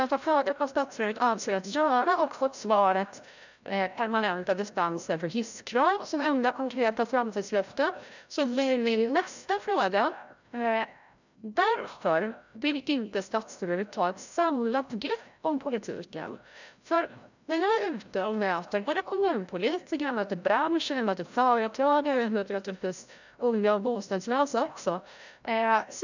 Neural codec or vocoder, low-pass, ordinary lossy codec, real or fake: codec, 16 kHz, 0.5 kbps, FreqCodec, larger model; 7.2 kHz; none; fake